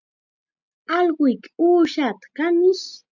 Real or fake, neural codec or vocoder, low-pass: real; none; 7.2 kHz